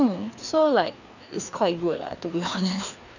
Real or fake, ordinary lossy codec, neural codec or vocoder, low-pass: fake; none; codec, 16 kHz, 2 kbps, FreqCodec, larger model; 7.2 kHz